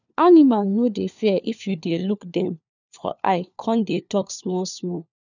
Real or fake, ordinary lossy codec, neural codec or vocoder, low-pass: fake; none; codec, 16 kHz, 4 kbps, FunCodec, trained on LibriTTS, 50 frames a second; 7.2 kHz